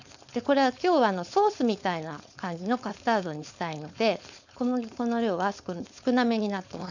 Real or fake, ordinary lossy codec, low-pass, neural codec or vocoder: fake; none; 7.2 kHz; codec, 16 kHz, 4.8 kbps, FACodec